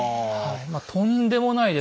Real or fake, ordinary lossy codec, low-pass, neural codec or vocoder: real; none; none; none